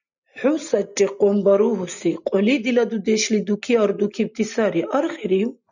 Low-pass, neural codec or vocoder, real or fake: 7.2 kHz; none; real